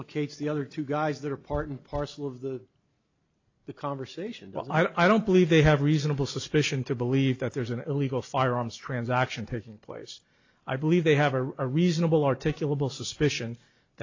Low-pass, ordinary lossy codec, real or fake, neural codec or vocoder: 7.2 kHz; AAC, 48 kbps; real; none